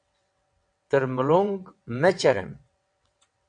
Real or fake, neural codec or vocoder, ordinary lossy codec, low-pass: fake; vocoder, 22.05 kHz, 80 mel bands, WaveNeXt; AAC, 64 kbps; 9.9 kHz